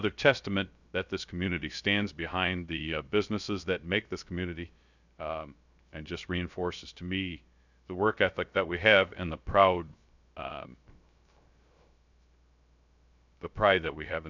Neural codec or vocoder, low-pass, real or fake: codec, 16 kHz, 0.3 kbps, FocalCodec; 7.2 kHz; fake